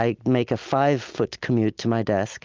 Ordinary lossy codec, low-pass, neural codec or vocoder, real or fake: Opus, 24 kbps; 7.2 kHz; none; real